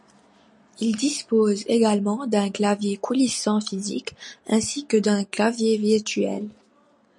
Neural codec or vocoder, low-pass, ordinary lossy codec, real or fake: none; 10.8 kHz; MP3, 48 kbps; real